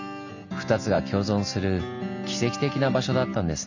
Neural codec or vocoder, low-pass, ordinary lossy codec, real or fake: none; 7.2 kHz; Opus, 64 kbps; real